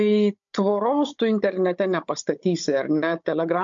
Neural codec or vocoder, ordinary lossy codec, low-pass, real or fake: codec, 16 kHz, 16 kbps, FunCodec, trained on Chinese and English, 50 frames a second; MP3, 48 kbps; 7.2 kHz; fake